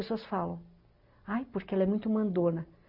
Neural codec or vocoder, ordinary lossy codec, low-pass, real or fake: none; Opus, 64 kbps; 5.4 kHz; real